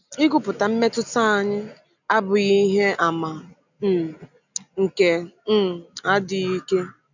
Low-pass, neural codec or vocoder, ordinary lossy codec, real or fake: 7.2 kHz; none; none; real